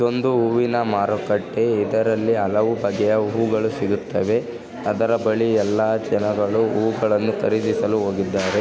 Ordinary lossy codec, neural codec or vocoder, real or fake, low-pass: none; none; real; none